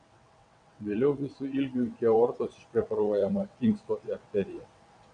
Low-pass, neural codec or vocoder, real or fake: 9.9 kHz; vocoder, 22.05 kHz, 80 mel bands, WaveNeXt; fake